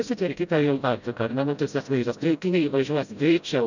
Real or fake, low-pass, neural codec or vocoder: fake; 7.2 kHz; codec, 16 kHz, 0.5 kbps, FreqCodec, smaller model